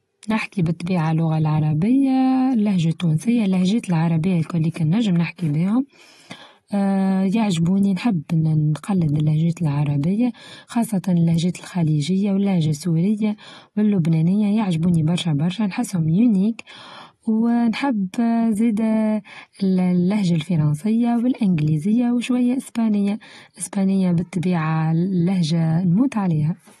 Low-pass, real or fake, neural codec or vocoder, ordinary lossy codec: 19.8 kHz; real; none; AAC, 32 kbps